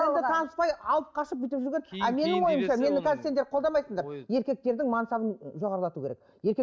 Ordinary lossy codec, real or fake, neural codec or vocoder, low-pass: none; real; none; none